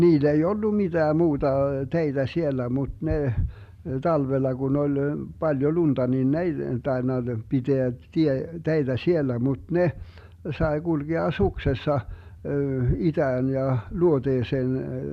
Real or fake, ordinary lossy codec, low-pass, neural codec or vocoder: real; none; 14.4 kHz; none